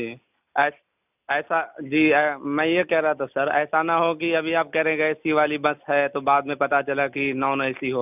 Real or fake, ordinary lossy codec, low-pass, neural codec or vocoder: real; none; 3.6 kHz; none